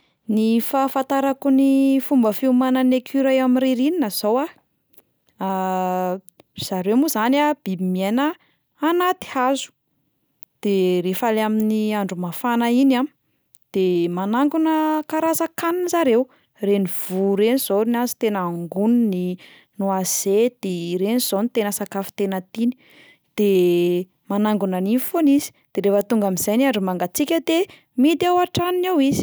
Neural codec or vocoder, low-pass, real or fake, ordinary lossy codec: none; none; real; none